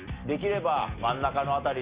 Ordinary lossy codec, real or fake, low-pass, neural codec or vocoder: Opus, 64 kbps; real; 3.6 kHz; none